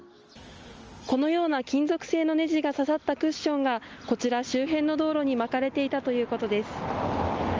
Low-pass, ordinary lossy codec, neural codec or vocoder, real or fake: 7.2 kHz; Opus, 24 kbps; none; real